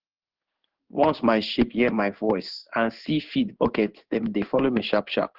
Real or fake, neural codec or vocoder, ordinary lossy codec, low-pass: fake; codec, 16 kHz in and 24 kHz out, 1 kbps, XY-Tokenizer; Opus, 16 kbps; 5.4 kHz